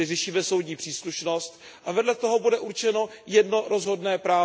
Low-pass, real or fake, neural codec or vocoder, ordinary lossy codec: none; real; none; none